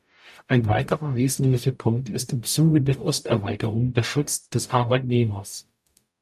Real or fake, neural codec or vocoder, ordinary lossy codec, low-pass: fake; codec, 44.1 kHz, 0.9 kbps, DAC; AAC, 96 kbps; 14.4 kHz